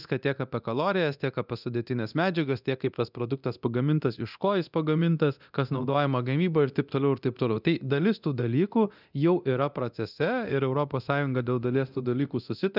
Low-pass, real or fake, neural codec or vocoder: 5.4 kHz; fake; codec, 24 kHz, 0.9 kbps, DualCodec